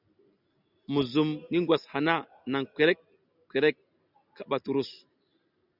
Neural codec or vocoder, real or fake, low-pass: none; real; 5.4 kHz